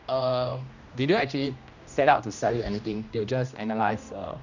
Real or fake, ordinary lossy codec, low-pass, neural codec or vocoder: fake; none; 7.2 kHz; codec, 16 kHz, 1 kbps, X-Codec, HuBERT features, trained on general audio